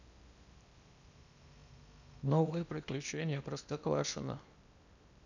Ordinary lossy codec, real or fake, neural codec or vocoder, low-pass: none; fake; codec, 16 kHz in and 24 kHz out, 0.8 kbps, FocalCodec, streaming, 65536 codes; 7.2 kHz